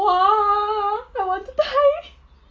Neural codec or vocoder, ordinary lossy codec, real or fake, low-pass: none; Opus, 32 kbps; real; 7.2 kHz